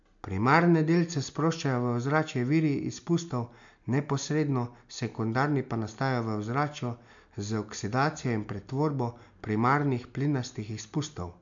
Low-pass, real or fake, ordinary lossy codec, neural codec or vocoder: 7.2 kHz; real; MP3, 64 kbps; none